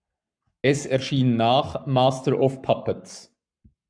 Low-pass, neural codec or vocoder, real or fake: 9.9 kHz; codec, 44.1 kHz, 7.8 kbps, Pupu-Codec; fake